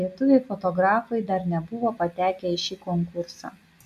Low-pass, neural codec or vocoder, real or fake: 14.4 kHz; none; real